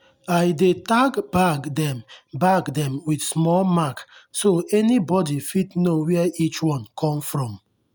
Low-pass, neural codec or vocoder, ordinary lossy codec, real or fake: none; none; none; real